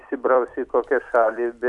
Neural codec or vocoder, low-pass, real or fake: vocoder, 44.1 kHz, 128 mel bands every 256 samples, BigVGAN v2; 10.8 kHz; fake